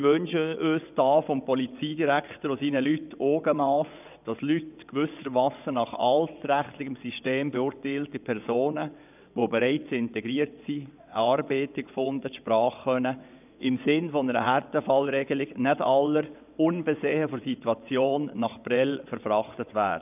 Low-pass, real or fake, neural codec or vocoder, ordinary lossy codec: 3.6 kHz; fake; vocoder, 22.05 kHz, 80 mel bands, WaveNeXt; none